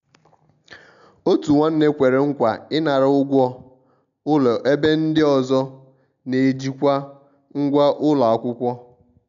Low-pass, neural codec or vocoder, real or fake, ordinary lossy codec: 7.2 kHz; none; real; none